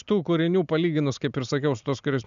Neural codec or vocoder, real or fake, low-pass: none; real; 7.2 kHz